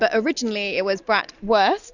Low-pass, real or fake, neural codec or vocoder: 7.2 kHz; real; none